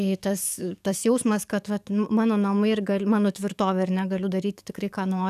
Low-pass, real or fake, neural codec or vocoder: 14.4 kHz; fake; codec, 44.1 kHz, 7.8 kbps, DAC